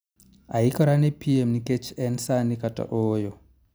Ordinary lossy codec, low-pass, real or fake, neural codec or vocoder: none; none; real; none